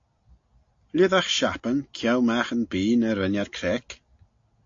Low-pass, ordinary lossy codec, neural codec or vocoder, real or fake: 7.2 kHz; AAC, 48 kbps; none; real